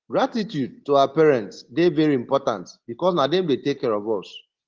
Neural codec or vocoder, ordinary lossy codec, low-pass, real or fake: none; Opus, 16 kbps; 7.2 kHz; real